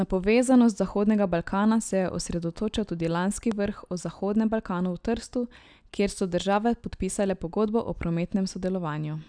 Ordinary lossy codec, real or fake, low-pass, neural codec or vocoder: none; real; 9.9 kHz; none